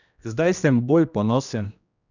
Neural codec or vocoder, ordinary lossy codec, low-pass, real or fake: codec, 16 kHz, 1 kbps, X-Codec, HuBERT features, trained on general audio; none; 7.2 kHz; fake